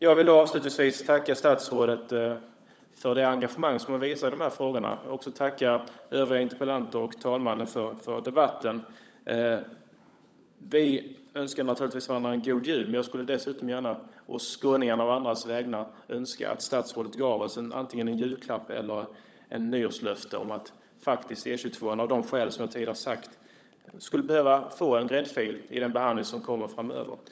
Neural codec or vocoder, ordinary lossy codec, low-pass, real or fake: codec, 16 kHz, 16 kbps, FunCodec, trained on LibriTTS, 50 frames a second; none; none; fake